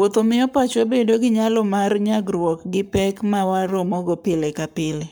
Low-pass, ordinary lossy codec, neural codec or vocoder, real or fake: none; none; codec, 44.1 kHz, 7.8 kbps, Pupu-Codec; fake